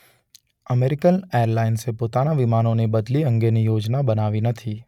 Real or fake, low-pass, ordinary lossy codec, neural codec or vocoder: real; 19.8 kHz; none; none